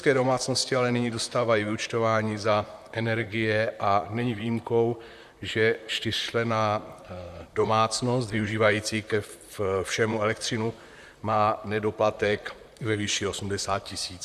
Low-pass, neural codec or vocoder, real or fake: 14.4 kHz; vocoder, 44.1 kHz, 128 mel bands, Pupu-Vocoder; fake